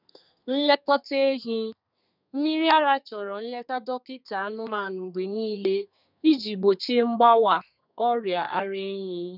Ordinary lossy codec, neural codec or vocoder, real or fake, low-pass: none; codec, 32 kHz, 1.9 kbps, SNAC; fake; 5.4 kHz